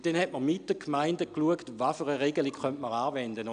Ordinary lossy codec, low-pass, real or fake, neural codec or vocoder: none; 9.9 kHz; real; none